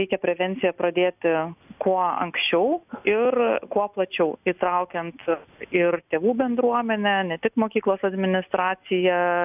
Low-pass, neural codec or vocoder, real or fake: 3.6 kHz; none; real